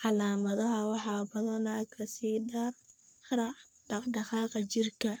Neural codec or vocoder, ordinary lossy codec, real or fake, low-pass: codec, 44.1 kHz, 3.4 kbps, Pupu-Codec; none; fake; none